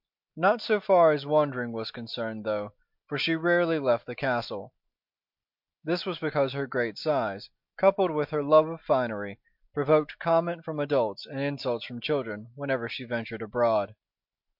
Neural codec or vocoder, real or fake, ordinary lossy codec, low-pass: none; real; MP3, 48 kbps; 5.4 kHz